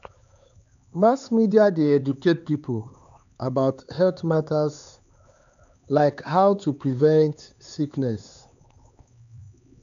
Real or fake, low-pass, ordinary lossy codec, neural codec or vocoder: fake; 7.2 kHz; none; codec, 16 kHz, 4 kbps, X-Codec, HuBERT features, trained on LibriSpeech